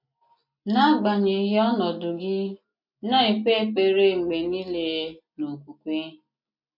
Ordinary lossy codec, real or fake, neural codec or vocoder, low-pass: MP3, 32 kbps; real; none; 5.4 kHz